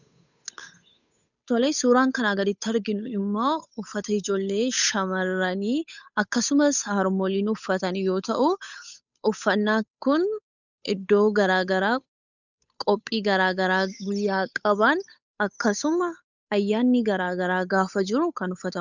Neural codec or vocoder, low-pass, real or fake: codec, 16 kHz, 8 kbps, FunCodec, trained on Chinese and English, 25 frames a second; 7.2 kHz; fake